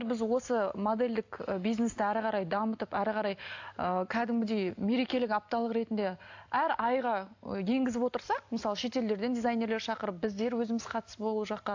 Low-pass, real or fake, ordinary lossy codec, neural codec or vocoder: 7.2 kHz; real; AAC, 48 kbps; none